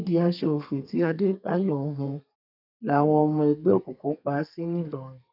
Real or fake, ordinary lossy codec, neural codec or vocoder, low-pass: fake; none; codec, 32 kHz, 1.9 kbps, SNAC; 5.4 kHz